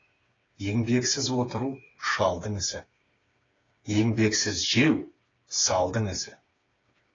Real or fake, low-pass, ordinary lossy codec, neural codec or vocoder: fake; 7.2 kHz; AAC, 32 kbps; codec, 16 kHz, 4 kbps, FreqCodec, smaller model